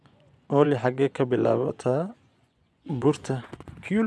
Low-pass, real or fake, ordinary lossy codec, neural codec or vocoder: none; fake; none; vocoder, 24 kHz, 100 mel bands, Vocos